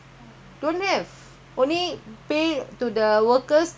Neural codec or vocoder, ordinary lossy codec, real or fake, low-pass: none; none; real; none